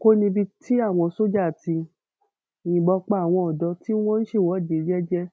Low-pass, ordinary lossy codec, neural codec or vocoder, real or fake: none; none; none; real